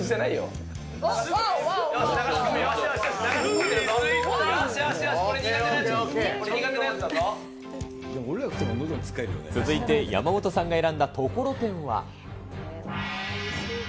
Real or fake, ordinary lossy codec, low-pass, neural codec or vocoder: real; none; none; none